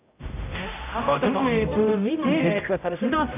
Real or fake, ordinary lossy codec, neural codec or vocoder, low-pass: fake; none; codec, 16 kHz, 0.5 kbps, X-Codec, HuBERT features, trained on general audio; 3.6 kHz